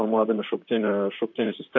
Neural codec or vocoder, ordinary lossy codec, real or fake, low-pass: vocoder, 44.1 kHz, 128 mel bands, Pupu-Vocoder; MP3, 48 kbps; fake; 7.2 kHz